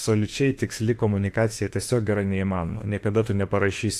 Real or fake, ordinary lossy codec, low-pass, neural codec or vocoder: fake; AAC, 64 kbps; 14.4 kHz; autoencoder, 48 kHz, 32 numbers a frame, DAC-VAE, trained on Japanese speech